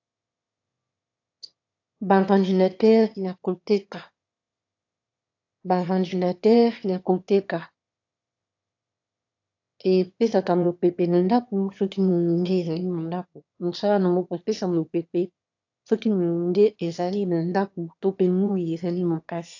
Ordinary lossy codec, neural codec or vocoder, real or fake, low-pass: AAC, 48 kbps; autoencoder, 22.05 kHz, a latent of 192 numbers a frame, VITS, trained on one speaker; fake; 7.2 kHz